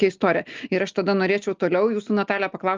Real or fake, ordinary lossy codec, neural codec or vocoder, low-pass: real; Opus, 32 kbps; none; 7.2 kHz